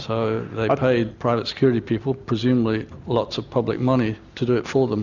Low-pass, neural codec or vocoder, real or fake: 7.2 kHz; none; real